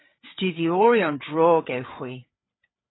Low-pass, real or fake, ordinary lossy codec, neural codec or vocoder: 7.2 kHz; fake; AAC, 16 kbps; codec, 16 kHz, 8 kbps, FreqCodec, larger model